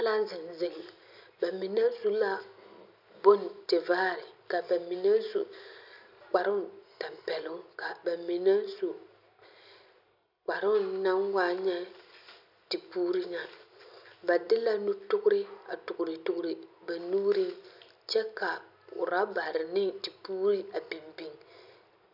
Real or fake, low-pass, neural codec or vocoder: real; 5.4 kHz; none